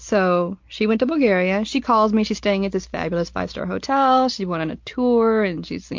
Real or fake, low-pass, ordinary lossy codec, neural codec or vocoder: real; 7.2 kHz; MP3, 48 kbps; none